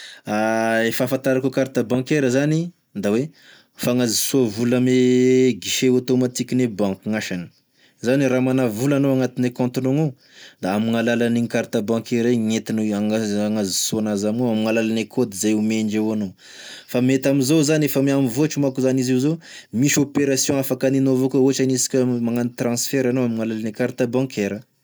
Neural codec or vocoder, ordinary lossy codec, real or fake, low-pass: none; none; real; none